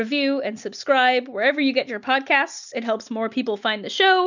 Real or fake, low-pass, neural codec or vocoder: real; 7.2 kHz; none